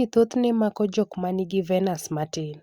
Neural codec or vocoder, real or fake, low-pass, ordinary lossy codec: none; real; 19.8 kHz; Opus, 64 kbps